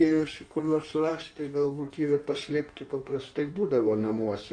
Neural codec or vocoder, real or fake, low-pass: codec, 16 kHz in and 24 kHz out, 1.1 kbps, FireRedTTS-2 codec; fake; 9.9 kHz